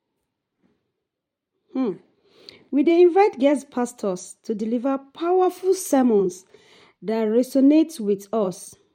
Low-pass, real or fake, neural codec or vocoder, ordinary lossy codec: 19.8 kHz; fake; vocoder, 44.1 kHz, 128 mel bands every 512 samples, BigVGAN v2; MP3, 64 kbps